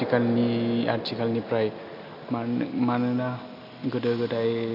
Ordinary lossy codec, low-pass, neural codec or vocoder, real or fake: none; 5.4 kHz; none; real